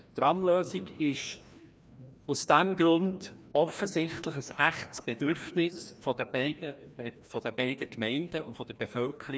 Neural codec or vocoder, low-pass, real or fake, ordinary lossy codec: codec, 16 kHz, 1 kbps, FreqCodec, larger model; none; fake; none